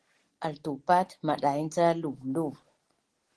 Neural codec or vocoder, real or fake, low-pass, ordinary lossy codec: none; real; 10.8 kHz; Opus, 16 kbps